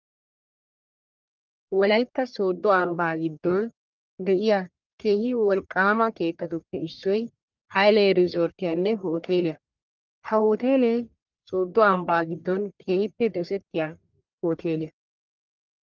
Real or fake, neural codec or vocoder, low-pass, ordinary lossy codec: fake; codec, 44.1 kHz, 1.7 kbps, Pupu-Codec; 7.2 kHz; Opus, 24 kbps